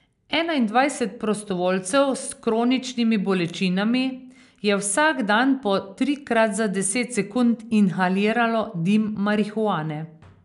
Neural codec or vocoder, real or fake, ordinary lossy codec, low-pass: none; real; none; 10.8 kHz